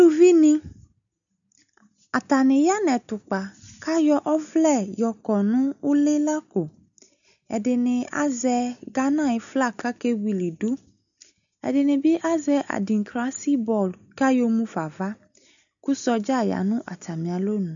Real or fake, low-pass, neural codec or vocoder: real; 7.2 kHz; none